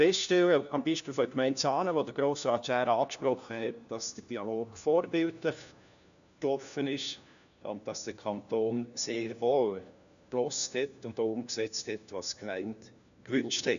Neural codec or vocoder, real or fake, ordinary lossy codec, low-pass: codec, 16 kHz, 1 kbps, FunCodec, trained on LibriTTS, 50 frames a second; fake; none; 7.2 kHz